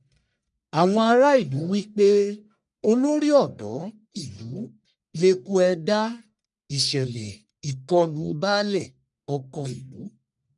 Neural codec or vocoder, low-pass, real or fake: codec, 44.1 kHz, 1.7 kbps, Pupu-Codec; 10.8 kHz; fake